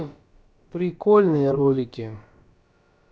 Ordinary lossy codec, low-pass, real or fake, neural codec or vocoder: none; none; fake; codec, 16 kHz, about 1 kbps, DyCAST, with the encoder's durations